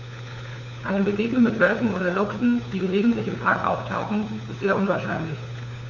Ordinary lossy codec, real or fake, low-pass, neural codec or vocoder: Opus, 64 kbps; fake; 7.2 kHz; codec, 16 kHz, 4 kbps, FunCodec, trained on LibriTTS, 50 frames a second